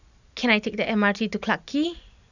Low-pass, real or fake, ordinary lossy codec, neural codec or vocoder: 7.2 kHz; fake; none; vocoder, 44.1 kHz, 128 mel bands every 512 samples, BigVGAN v2